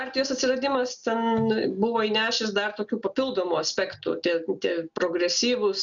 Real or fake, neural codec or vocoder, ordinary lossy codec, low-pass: real; none; MP3, 96 kbps; 7.2 kHz